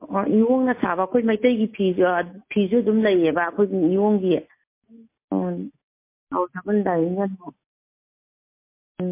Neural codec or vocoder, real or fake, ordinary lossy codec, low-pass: none; real; MP3, 24 kbps; 3.6 kHz